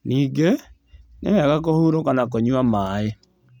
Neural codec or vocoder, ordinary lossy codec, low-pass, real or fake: none; none; 19.8 kHz; real